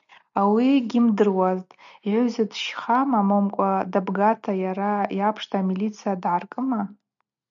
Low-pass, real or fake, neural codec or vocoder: 7.2 kHz; real; none